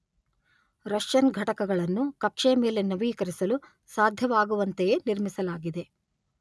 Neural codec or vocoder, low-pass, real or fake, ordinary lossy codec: none; none; real; none